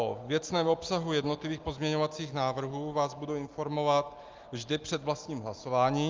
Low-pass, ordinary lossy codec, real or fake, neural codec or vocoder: 7.2 kHz; Opus, 32 kbps; real; none